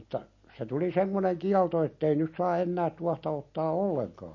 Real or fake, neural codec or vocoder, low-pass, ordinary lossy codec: real; none; 7.2 kHz; MP3, 32 kbps